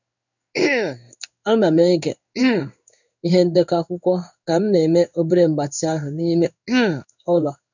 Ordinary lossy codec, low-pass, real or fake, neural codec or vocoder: none; 7.2 kHz; fake; codec, 16 kHz in and 24 kHz out, 1 kbps, XY-Tokenizer